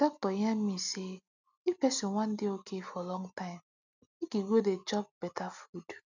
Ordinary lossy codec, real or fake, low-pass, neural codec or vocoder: none; real; 7.2 kHz; none